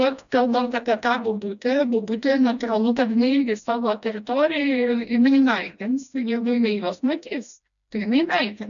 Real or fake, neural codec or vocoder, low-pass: fake; codec, 16 kHz, 1 kbps, FreqCodec, smaller model; 7.2 kHz